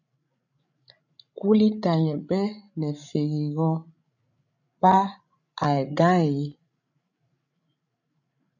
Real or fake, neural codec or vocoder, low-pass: fake; codec, 16 kHz, 16 kbps, FreqCodec, larger model; 7.2 kHz